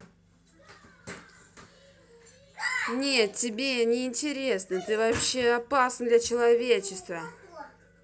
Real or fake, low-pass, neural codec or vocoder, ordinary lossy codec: real; none; none; none